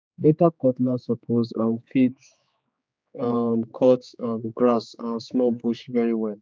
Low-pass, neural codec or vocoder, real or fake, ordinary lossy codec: none; codec, 16 kHz, 4 kbps, X-Codec, HuBERT features, trained on general audio; fake; none